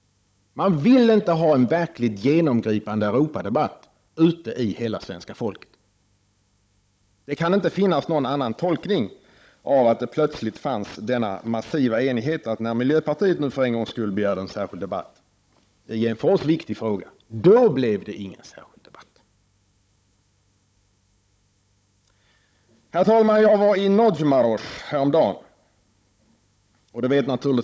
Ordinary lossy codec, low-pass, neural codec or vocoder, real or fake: none; none; codec, 16 kHz, 16 kbps, FunCodec, trained on Chinese and English, 50 frames a second; fake